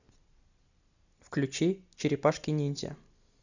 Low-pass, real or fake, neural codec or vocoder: 7.2 kHz; real; none